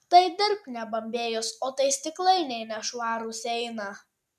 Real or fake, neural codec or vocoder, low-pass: real; none; 14.4 kHz